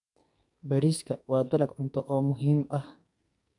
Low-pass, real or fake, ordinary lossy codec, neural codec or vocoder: 10.8 kHz; fake; none; codec, 44.1 kHz, 2.6 kbps, SNAC